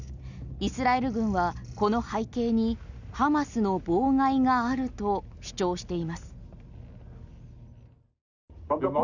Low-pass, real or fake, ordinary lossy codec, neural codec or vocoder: 7.2 kHz; real; none; none